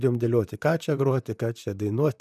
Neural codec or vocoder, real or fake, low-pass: vocoder, 44.1 kHz, 128 mel bands, Pupu-Vocoder; fake; 14.4 kHz